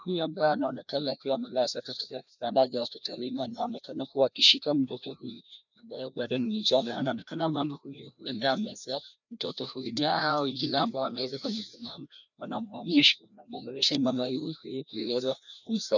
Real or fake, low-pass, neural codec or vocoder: fake; 7.2 kHz; codec, 16 kHz, 1 kbps, FreqCodec, larger model